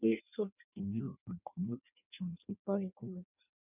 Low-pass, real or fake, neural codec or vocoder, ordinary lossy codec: 3.6 kHz; fake; codec, 16 kHz in and 24 kHz out, 0.6 kbps, FireRedTTS-2 codec; none